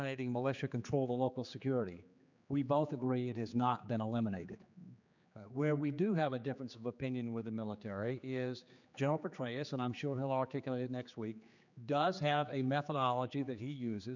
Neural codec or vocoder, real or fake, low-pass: codec, 16 kHz, 4 kbps, X-Codec, HuBERT features, trained on general audio; fake; 7.2 kHz